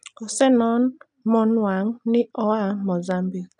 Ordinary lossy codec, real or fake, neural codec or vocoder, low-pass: none; real; none; 9.9 kHz